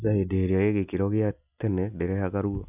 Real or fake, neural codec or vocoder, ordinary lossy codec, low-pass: real; none; none; 3.6 kHz